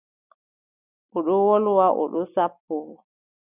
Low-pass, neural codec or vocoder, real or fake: 3.6 kHz; none; real